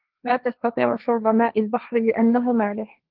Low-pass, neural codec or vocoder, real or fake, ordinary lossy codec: 5.4 kHz; codec, 16 kHz, 1.1 kbps, Voila-Tokenizer; fake; Opus, 32 kbps